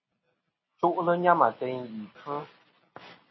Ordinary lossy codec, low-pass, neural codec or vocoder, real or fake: MP3, 24 kbps; 7.2 kHz; none; real